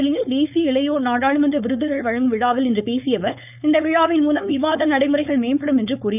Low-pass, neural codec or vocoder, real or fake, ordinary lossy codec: 3.6 kHz; codec, 16 kHz, 4.8 kbps, FACodec; fake; none